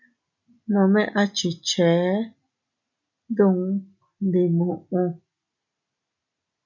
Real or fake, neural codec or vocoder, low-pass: real; none; 7.2 kHz